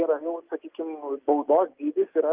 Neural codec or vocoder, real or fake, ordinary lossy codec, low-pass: none; real; Opus, 24 kbps; 3.6 kHz